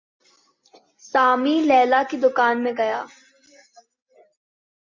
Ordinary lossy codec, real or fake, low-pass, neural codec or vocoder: MP3, 48 kbps; real; 7.2 kHz; none